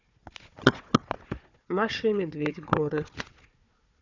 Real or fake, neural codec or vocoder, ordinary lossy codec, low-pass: fake; codec, 16 kHz, 4 kbps, FunCodec, trained on Chinese and English, 50 frames a second; none; 7.2 kHz